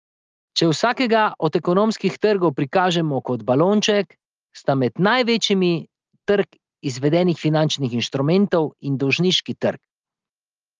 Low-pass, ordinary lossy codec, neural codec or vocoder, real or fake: 7.2 kHz; Opus, 24 kbps; none; real